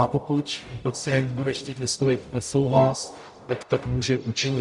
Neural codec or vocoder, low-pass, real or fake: codec, 44.1 kHz, 0.9 kbps, DAC; 10.8 kHz; fake